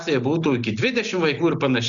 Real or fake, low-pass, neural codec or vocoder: real; 7.2 kHz; none